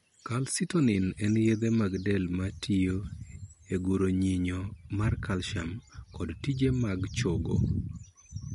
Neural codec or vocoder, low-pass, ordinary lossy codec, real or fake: none; 19.8 kHz; MP3, 48 kbps; real